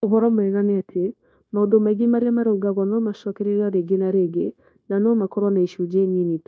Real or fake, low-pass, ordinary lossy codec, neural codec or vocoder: fake; none; none; codec, 16 kHz, 0.9 kbps, LongCat-Audio-Codec